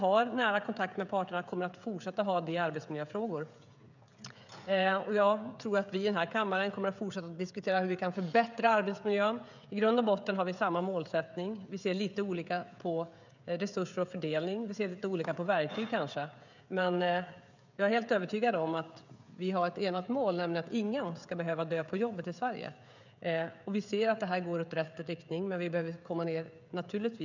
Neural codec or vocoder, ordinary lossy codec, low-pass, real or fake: codec, 16 kHz, 16 kbps, FreqCodec, smaller model; none; 7.2 kHz; fake